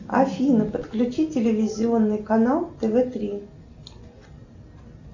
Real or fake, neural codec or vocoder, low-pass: real; none; 7.2 kHz